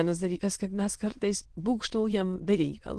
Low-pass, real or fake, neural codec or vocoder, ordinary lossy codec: 9.9 kHz; fake; autoencoder, 22.05 kHz, a latent of 192 numbers a frame, VITS, trained on many speakers; Opus, 16 kbps